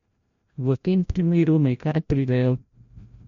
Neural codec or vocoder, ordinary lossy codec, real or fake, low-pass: codec, 16 kHz, 0.5 kbps, FreqCodec, larger model; MP3, 48 kbps; fake; 7.2 kHz